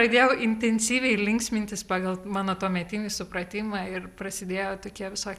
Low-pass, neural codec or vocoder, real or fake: 14.4 kHz; none; real